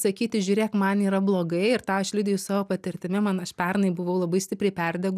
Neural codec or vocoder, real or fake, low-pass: none; real; 14.4 kHz